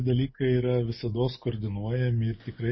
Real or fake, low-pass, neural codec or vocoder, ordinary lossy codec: real; 7.2 kHz; none; MP3, 24 kbps